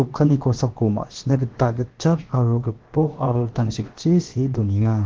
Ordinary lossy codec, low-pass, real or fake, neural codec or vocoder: Opus, 16 kbps; 7.2 kHz; fake; codec, 16 kHz, about 1 kbps, DyCAST, with the encoder's durations